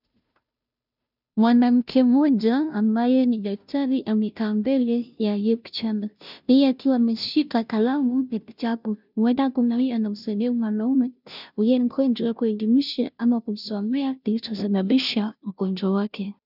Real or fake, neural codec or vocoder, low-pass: fake; codec, 16 kHz, 0.5 kbps, FunCodec, trained on Chinese and English, 25 frames a second; 5.4 kHz